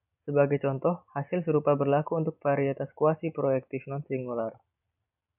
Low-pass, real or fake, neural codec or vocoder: 3.6 kHz; real; none